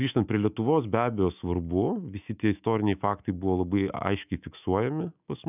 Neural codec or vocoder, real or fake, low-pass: autoencoder, 48 kHz, 128 numbers a frame, DAC-VAE, trained on Japanese speech; fake; 3.6 kHz